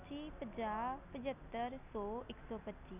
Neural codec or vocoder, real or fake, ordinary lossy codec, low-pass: none; real; none; 3.6 kHz